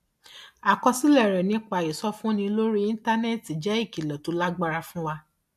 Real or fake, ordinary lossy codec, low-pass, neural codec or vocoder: real; MP3, 64 kbps; 14.4 kHz; none